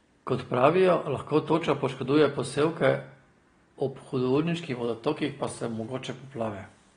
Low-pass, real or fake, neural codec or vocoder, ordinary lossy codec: 9.9 kHz; real; none; AAC, 32 kbps